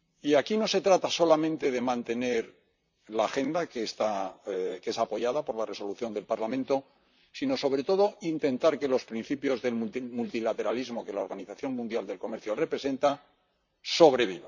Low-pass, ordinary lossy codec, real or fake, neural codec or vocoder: 7.2 kHz; none; fake; vocoder, 44.1 kHz, 128 mel bands, Pupu-Vocoder